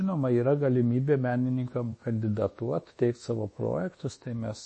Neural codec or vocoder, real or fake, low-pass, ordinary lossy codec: codec, 24 kHz, 1.2 kbps, DualCodec; fake; 10.8 kHz; MP3, 32 kbps